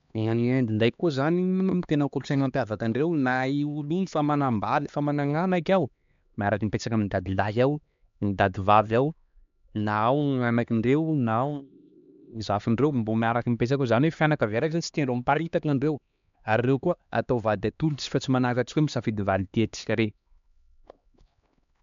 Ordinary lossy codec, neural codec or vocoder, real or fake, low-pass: MP3, 64 kbps; codec, 16 kHz, 2 kbps, X-Codec, HuBERT features, trained on LibriSpeech; fake; 7.2 kHz